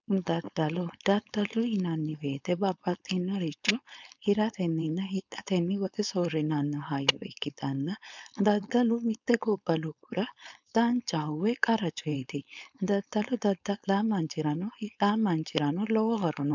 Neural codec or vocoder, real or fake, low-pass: codec, 16 kHz, 4.8 kbps, FACodec; fake; 7.2 kHz